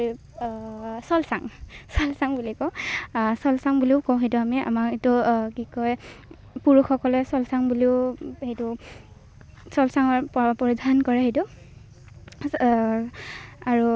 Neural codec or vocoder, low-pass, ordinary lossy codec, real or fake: none; none; none; real